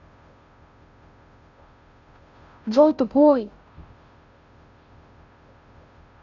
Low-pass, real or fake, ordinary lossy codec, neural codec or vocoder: 7.2 kHz; fake; none; codec, 16 kHz, 0.5 kbps, FunCodec, trained on Chinese and English, 25 frames a second